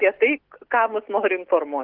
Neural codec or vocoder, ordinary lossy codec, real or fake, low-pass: none; Opus, 16 kbps; real; 5.4 kHz